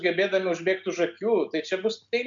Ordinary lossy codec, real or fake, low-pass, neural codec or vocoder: MP3, 64 kbps; real; 7.2 kHz; none